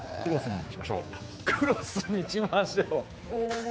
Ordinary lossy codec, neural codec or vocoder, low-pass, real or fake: none; codec, 16 kHz, 4 kbps, X-Codec, HuBERT features, trained on general audio; none; fake